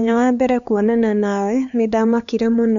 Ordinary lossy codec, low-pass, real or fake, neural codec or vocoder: none; 7.2 kHz; fake; codec, 16 kHz, 4 kbps, X-Codec, HuBERT features, trained on LibriSpeech